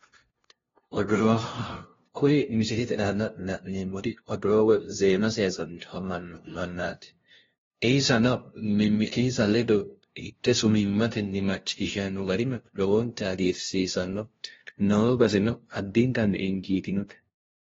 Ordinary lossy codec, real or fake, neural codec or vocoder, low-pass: AAC, 24 kbps; fake; codec, 16 kHz, 0.5 kbps, FunCodec, trained on LibriTTS, 25 frames a second; 7.2 kHz